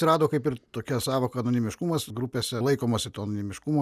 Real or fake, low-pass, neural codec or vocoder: real; 14.4 kHz; none